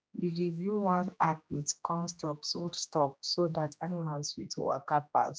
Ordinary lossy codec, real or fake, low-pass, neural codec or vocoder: none; fake; none; codec, 16 kHz, 1 kbps, X-Codec, HuBERT features, trained on general audio